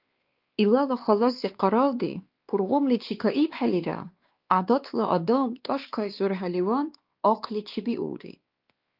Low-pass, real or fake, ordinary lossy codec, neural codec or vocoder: 5.4 kHz; fake; Opus, 24 kbps; codec, 16 kHz, 2 kbps, X-Codec, WavLM features, trained on Multilingual LibriSpeech